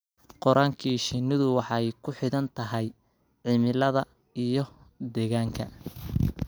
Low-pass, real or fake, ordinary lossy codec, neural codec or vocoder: none; real; none; none